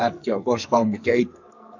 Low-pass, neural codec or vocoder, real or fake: 7.2 kHz; codec, 44.1 kHz, 2.6 kbps, SNAC; fake